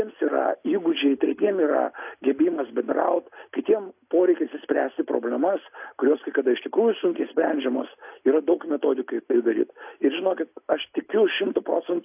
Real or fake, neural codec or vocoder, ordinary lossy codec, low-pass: real; none; AAC, 32 kbps; 3.6 kHz